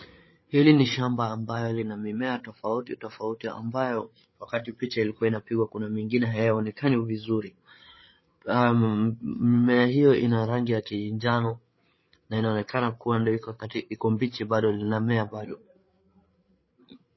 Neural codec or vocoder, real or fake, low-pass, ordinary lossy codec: codec, 16 kHz, 8 kbps, FreqCodec, larger model; fake; 7.2 kHz; MP3, 24 kbps